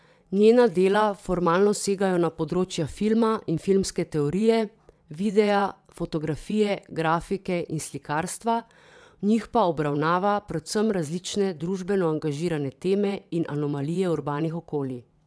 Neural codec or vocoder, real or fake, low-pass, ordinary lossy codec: vocoder, 22.05 kHz, 80 mel bands, WaveNeXt; fake; none; none